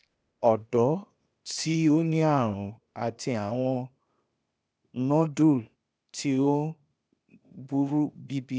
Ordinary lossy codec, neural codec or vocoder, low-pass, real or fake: none; codec, 16 kHz, 0.8 kbps, ZipCodec; none; fake